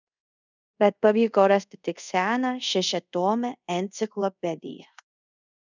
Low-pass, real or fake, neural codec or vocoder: 7.2 kHz; fake; codec, 24 kHz, 0.5 kbps, DualCodec